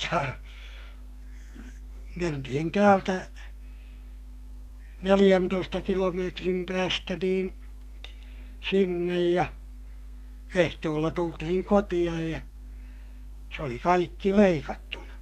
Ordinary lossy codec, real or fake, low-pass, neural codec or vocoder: none; fake; 14.4 kHz; codec, 32 kHz, 1.9 kbps, SNAC